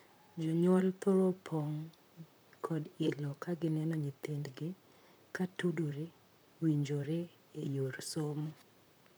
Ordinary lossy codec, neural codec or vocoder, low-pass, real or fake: none; vocoder, 44.1 kHz, 128 mel bands, Pupu-Vocoder; none; fake